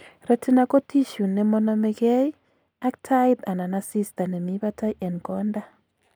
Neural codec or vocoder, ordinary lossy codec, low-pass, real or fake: none; none; none; real